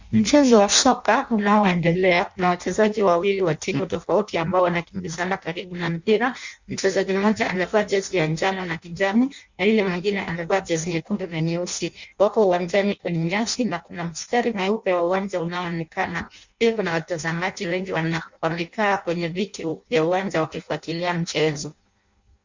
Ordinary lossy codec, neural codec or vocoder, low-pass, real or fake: Opus, 64 kbps; codec, 16 kHz in and 24 kHz out, 0.6 kbps, FireRedTTS-2 codec; 7.2 kHz; fake